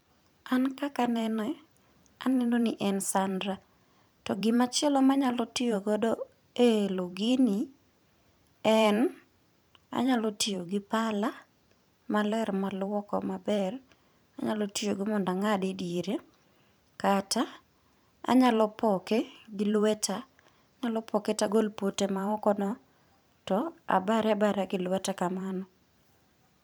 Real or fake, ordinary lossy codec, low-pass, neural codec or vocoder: fake; none; none; vocoder, 44.1 kHz, 128 mel bands every 512 samples, BigVGAN v2